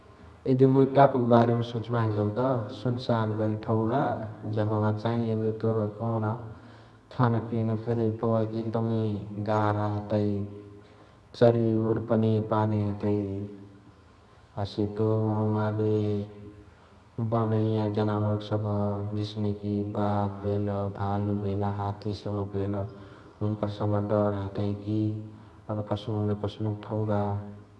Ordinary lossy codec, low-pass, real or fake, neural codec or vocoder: none; none; fake; codec, 24 kHz, 0.9 kbps, WavTokenizer, medium music audio release